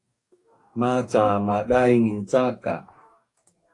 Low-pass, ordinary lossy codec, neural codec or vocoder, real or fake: 10.8 kHz; AAC, 48 kbps; codec, 44.1 kHz, 2.6 kbps, DAC; fake